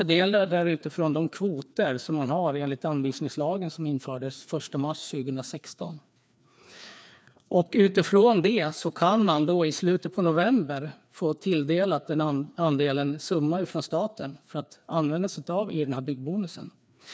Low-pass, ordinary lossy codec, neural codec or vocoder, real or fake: none; none; codec, 16 kHz, 2 kbps, FreqCodec, larger model; fake